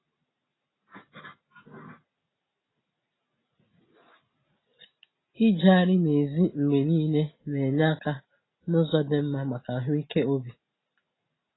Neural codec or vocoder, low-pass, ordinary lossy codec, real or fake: none; 7.2 kHz; AAC, 16 kbps; real